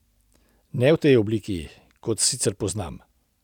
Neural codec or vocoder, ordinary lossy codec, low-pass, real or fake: none; none; 19.8 kHz; real